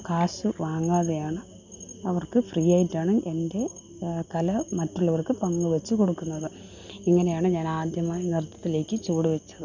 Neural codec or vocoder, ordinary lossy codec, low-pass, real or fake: none; none; 7.2 kHz; real